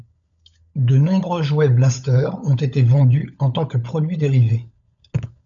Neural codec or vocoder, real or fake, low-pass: codec, 16 kHz, 16 kbps, FunCodec, trained on LibriTTS, 50 frames a second; fake; 7.2 kHz